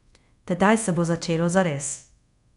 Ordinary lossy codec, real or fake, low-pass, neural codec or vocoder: none; fake; 10.8 kHz; codec, 24 kHz, 0.5 kbps, DualCodec